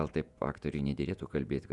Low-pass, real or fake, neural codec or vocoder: 10.8 kHz; fake; vocoder, 48 kHz, 128 mel bands, Vocos